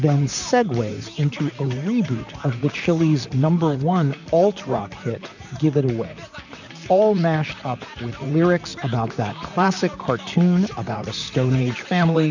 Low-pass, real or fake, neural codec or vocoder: 7.2 kHz; fake; vocoder, 44.1 kHz, 128 mel bands, Pupu-Vocoder